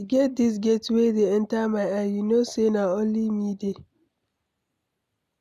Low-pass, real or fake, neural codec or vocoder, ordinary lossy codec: 14.4 kHz; real; none; none